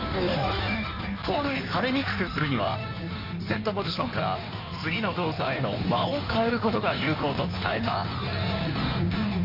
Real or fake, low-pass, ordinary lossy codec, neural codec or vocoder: fake; 5.4 kHz; AAC, 48 kbps; codec, 16 kHz in and 24 kHz out, 1.1 kbps, FireRedTTS-2 codec